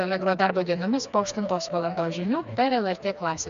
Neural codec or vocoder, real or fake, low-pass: codec, 16 kHz, 2 kbps, FreqCodec, smaller model; fake; 7.2 kHz